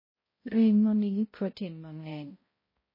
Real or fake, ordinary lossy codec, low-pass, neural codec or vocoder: fake; MP3, 24 kbps; 5.4 kHz; codec, 16 kHz, 0.5 kbps, X-Codec, HuBERT features, trained on balanced general audio